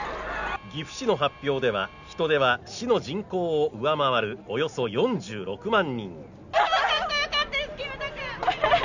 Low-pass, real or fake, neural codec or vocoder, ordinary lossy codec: 7.2 kHz; real; none; none